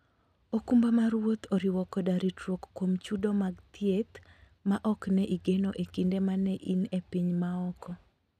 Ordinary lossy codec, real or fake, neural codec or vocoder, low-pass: none; real; none; 14.4 kHz